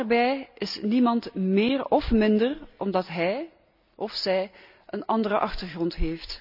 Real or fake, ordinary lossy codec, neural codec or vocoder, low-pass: real; none; none; 5.4 kHz